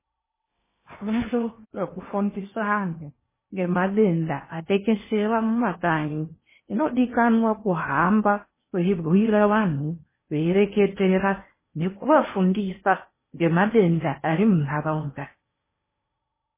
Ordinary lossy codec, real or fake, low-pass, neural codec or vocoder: MP3, 16 kbps; fake; 3.6 kHz; codec, 16 kHz in and 24 kHz out, 0.8 kbps, FocalCodec, streaming, 65536 codes